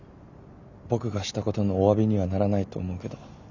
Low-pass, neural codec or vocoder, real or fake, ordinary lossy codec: 7.2 kHz; none; real; none